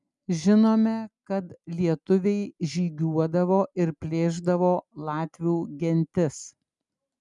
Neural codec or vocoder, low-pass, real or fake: none; 10.8 kHz; real